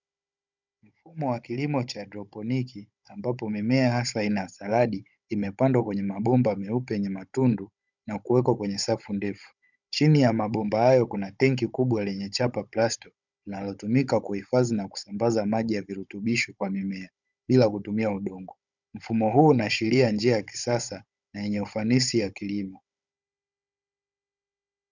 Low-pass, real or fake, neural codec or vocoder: 7.2 kHz; fake; codec, 16 kHz, 16 kbps, FunCodec, trained on Chinese and English, 50 frames a second